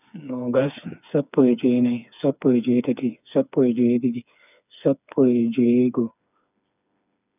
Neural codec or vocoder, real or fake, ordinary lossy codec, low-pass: codec, 16 kHz, 4 kbps, FreqCodec, smaller model; fake; none; 3.6 kHz